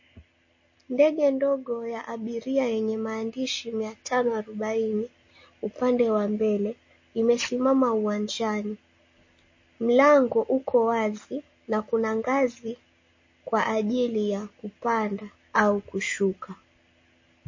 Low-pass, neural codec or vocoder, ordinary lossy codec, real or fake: 7.2 kHz; none; MP3, 32 kbps; real